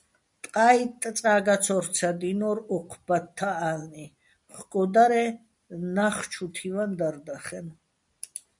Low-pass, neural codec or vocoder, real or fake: 10.8 kHz; none; real